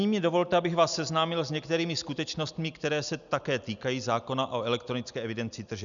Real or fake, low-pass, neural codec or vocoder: real; 7.2 kHz; none